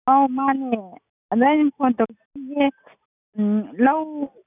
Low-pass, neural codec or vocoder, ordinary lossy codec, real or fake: 3.6 kHz; none; none; real